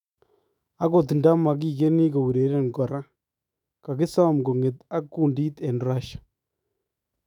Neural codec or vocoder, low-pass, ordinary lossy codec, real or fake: autoencoder, 48 kHz, 128 numbers a frame, DAC-VAE, trained on Japanese speech; 19.8 kHz; none; fake